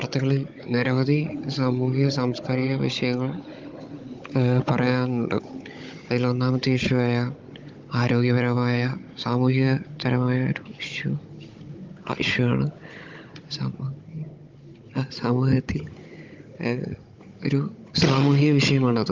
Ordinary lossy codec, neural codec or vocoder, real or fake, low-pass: Opus, 32 kbps; codec, 16 kHz, 16 kbps, FunCodec, trained on Chinese and English, 50 frames a second; fake; 7.2 kHz